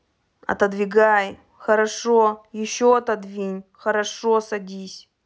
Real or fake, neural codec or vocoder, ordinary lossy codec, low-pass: real; none; none; none